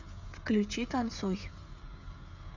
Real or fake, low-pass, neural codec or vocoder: fake; 7.2 kHz; codec, 16 kHz, 16 kbps, FreqCodec, smaller model